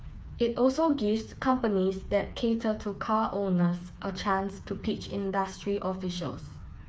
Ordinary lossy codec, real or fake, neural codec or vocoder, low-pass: none; fake; codec, 16 kHz, 4 kbps, FreqCodec, smaller model; none